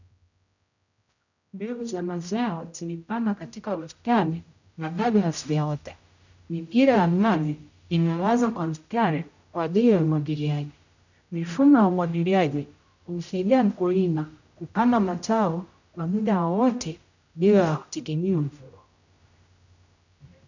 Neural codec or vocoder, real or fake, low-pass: codec, 16 kHz, 0.5 kbps, X-Codec, HuBERT features, trained on general audio; fake; 7.2 kHz